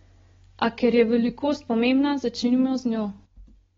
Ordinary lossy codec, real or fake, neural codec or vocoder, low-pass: AAC, 24 kbps; real; none; 7.2 kHz